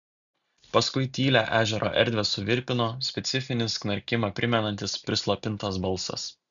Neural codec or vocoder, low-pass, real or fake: none; 7.2 kHz; real